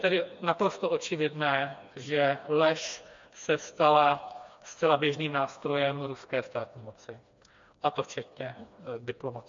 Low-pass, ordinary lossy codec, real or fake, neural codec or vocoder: 7.2 kHz; MP3, 48 kbps; fake; codec, 16 kHz, 2 kbps, FreqCodec, smaller model